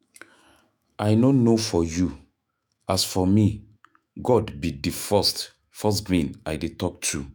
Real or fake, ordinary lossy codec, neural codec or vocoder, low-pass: fake; none; autoencoder, 48 kHz, 128 numbers a frame, DAC-VAE, trained on Japanese speech; none